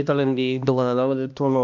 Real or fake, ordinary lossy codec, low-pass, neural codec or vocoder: fake; MP3, 64 kbps; 7.2 kHz; codec, 16 kHz, 1 kbps, X-Codec, HuBERT features, trained on balanced general audio